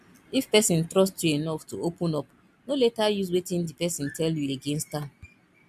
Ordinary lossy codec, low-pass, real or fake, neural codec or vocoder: MP3, 96 kbps; 14.4 kHz; real; none